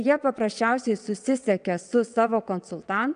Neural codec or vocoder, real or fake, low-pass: vocoder, 22.05 kHz, 80 mel bands, WaveNeXt; fake; 9.9 kHz